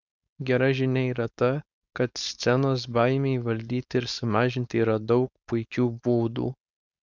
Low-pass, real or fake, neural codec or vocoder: 7.2 kHz; fake; codec, 16 kHz, 4.8 kbps, FACodec